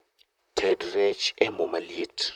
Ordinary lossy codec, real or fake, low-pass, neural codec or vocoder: none; fake; 19.8 kHz; vocoder, 44.1 kHz, 128 mel bands, Pupu-Vocoder